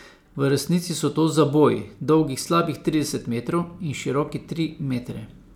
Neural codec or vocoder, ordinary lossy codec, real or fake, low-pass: none; none; real; 19.8 kHz